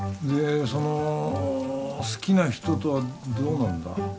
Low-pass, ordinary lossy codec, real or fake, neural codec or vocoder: none; none; real; none